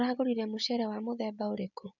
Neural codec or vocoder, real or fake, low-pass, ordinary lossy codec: none; real; 7.2 kHz; none